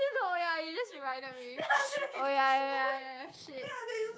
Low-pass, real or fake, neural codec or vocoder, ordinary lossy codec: none; fake; codec, 16 kHz, 6 kbps, DAC; none